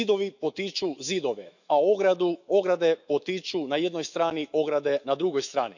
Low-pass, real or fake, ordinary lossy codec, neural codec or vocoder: 7.2 kHz; fake; none; autoencoder, 48 kHz, 128 numbers a frame, DAC-VAE, trained on Japanese speech